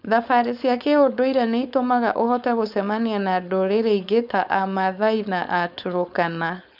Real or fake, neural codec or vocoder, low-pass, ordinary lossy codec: fake; codec, 16 kHz, 4.8 kbps, FACodec; 5.4 kHz; none